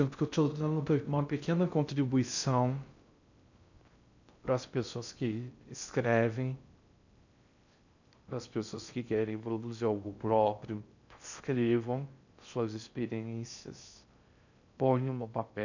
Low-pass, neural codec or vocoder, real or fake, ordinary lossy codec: 7.2 kHz; codec, 16 kHz in and 24 kHz out, 0.6 kbps, FocalCodec, streaming, 2048 codes; fake; none